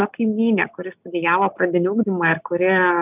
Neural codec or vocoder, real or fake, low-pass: none; real; 3.6 kHz